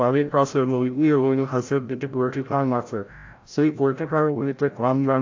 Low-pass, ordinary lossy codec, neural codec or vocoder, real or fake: 7.2 kHz; AAC, 48 kbps; codec, 16 kHz, 0.5 kbps, FreqCodec, larger model; fake